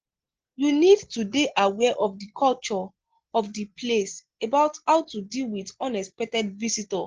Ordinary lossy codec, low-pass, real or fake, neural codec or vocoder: Opus, 16 kbps; 7.2 kHz; real; none